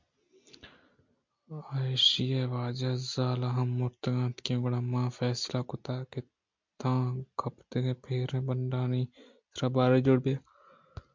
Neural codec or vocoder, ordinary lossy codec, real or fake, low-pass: none; MP3, 64 kbps; real; 7.2 kHz